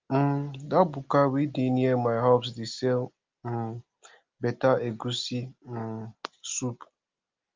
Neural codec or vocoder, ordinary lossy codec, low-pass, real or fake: none; Opus, 32 kbps; 7.2 kHz; real